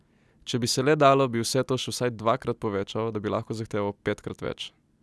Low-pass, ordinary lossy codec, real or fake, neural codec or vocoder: none; none; real; none